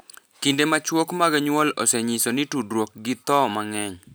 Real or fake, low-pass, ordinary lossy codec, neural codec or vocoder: real; none; none; none